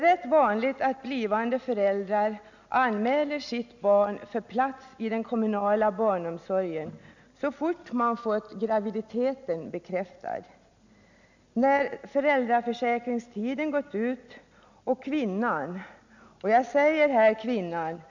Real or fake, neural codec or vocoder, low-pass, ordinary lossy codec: real; none; 7.2 kHz; none